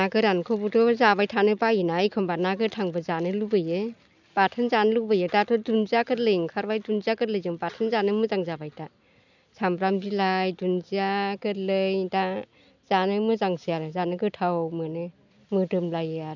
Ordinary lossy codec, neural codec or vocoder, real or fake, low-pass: none; none; real; 7.2 kHz